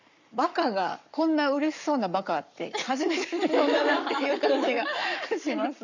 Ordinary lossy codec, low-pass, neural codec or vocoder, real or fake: none; 7.2 kHz; codec, 44.1 kHz, 7.8 kbps, Pupu-Codec; fake